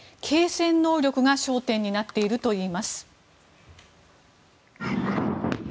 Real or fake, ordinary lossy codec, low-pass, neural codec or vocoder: real; none; none; none